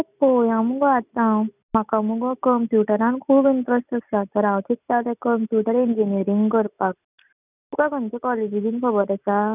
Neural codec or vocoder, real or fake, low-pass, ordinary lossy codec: none; real; 3.6 kHz; none